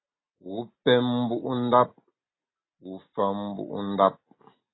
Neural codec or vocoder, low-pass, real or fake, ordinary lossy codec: none; 7.2 kHz; real; AAC, 16 kbps